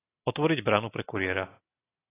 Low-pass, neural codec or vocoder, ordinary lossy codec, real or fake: 3.6 kHz; none; AAC, 16 kbps; real